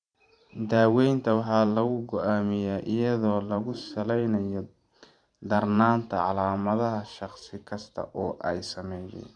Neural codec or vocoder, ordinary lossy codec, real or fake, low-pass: vocoder, 44.1 kHz, 128 mel bands every 512 samples, BigVGAN v2; none; fake; 9.9 kHz